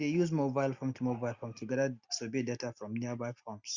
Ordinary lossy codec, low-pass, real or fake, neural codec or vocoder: Opus, 64 kbps; 7.2 kHz; real; none